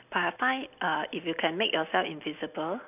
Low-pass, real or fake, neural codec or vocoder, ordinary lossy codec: 3.6 kHz; real; none; none